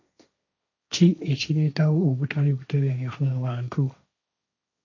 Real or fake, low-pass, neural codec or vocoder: fake; 7.2 kHz; codec, 16 kHz, 1.1 kbps, Voila-Tokenizer